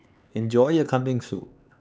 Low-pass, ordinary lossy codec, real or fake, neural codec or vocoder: none; none; fake; codec, 16 kHz, 4 kbps, X-Codec, HuBERT features, trained on LibriSpeech